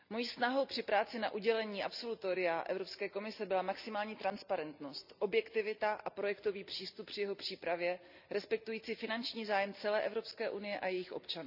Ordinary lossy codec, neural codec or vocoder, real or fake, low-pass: none; none; real; 5.4 kHz